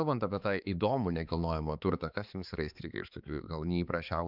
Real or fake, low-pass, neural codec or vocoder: fake; 5.4 kHz; codec, 16 kHz, 4 kbps, X-Codec, HuBERT features, trained on balanced general audio